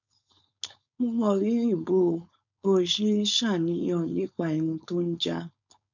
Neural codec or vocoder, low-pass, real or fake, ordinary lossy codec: codec, 16 kHz, 4.8 kbps, FACodec; 7.2 kHz; fake; none